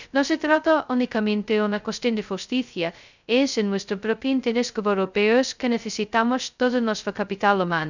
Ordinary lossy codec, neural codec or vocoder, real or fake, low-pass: none; codec, 16 kHz, 0.2 kbps, FocalCodec; fake; 7.2 kHz